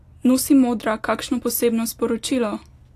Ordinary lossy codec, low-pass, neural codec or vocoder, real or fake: AAC, 64 kbps; 14.4 kHz; none; real